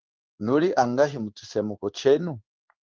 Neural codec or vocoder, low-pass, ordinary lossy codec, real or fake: codec, 16 kHz in and 24 kHz out, 1 kbps, XY-Tokenizer; 7.2 kHz; Opus, 24 kbps; fake